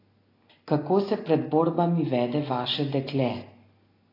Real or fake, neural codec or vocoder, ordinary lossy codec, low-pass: real; none; AAC, 24 kbps; 5.4 kHz